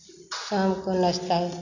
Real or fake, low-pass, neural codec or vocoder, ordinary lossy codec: real; 7.2 kHz; none; none